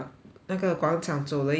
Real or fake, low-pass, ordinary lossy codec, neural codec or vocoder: real; none; none; none